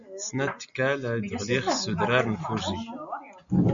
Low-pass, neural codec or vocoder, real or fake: 7.2 kHz; none; real